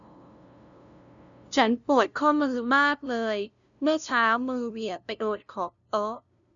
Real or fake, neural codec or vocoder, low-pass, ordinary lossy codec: fake; codec, 16 kHz, 0.5 kbps, FunCodec, trained on LibriTTS, 25 frames a second; 7.2 kHz; none